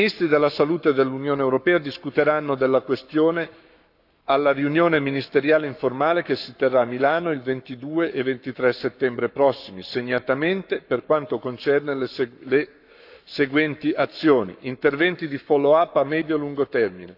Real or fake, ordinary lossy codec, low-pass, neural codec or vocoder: fake; none; 5.4 kHz; codec, 44.1 kHz, 7.8 kbps, Pupu-Codec